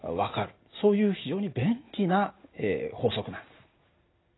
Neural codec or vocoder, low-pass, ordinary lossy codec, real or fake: none; 7.2 kHz; AAC, 16 kbps; real